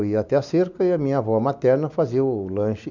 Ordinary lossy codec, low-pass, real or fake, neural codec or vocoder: none; 7.2 kHz; real; none